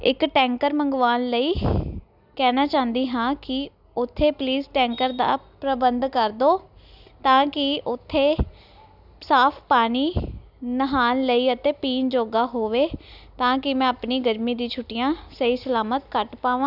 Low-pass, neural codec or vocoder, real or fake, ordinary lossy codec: 5.4 kHz; none; real; none